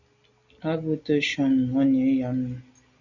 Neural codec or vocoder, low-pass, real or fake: none; 7.2 kHz; real